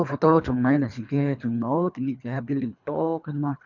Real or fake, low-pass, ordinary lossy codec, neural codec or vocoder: fake; 7.2 kHz; none; codec, 24 kHz, 3 kbps, HILCodec